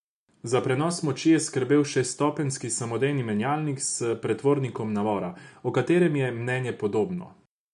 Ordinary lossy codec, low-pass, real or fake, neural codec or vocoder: none; 10.8 kHz; real; none